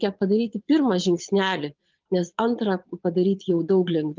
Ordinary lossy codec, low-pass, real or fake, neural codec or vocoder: Opus, 32 kbps; 7.2 kHz; fake; vocoder, 22.05 kHz, 80 mel bands, Vocos